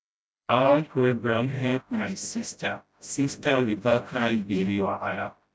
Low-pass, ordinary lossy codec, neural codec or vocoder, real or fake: none; none; codec, 16 kHz, 0.5 kbps, FreqCodec, smaller model; fake